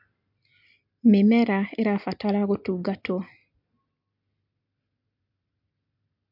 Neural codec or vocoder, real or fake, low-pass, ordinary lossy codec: none; real; 5.4 kHz; MP3, 48 kbps